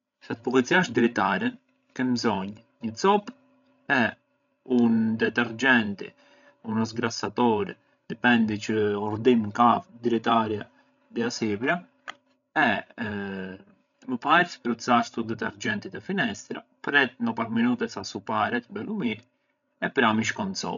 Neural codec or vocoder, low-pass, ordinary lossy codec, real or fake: codec, 16 kHz, 16 kbps, FreqCodec, larger model; 7.2 kHz; none; fake